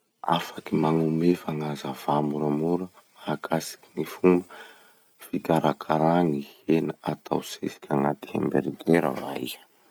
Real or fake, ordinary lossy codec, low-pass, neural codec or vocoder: real; none; none; none